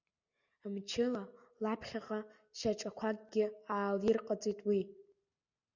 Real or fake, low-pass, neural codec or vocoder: real; 7.2 kHz; none